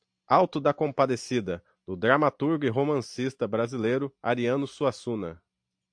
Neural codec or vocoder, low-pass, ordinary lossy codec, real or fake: none; 9.9 kHz; AAC, 64 kbps; real